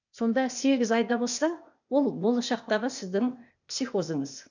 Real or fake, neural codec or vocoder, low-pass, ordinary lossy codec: fake; codec, 16 kHz, 0.8 kbps, ZipCodec; 7.2 kHz; none